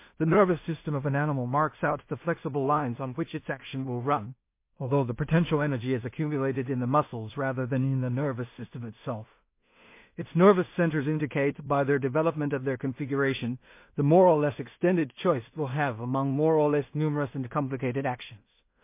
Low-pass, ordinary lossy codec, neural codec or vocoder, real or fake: 3.6 kHz; MP3, 24 kbps; codec, 16 kHz in and 24 kHz out, 0.4 kbps, LongCat-Audio-Codec, two codebook decoder; fake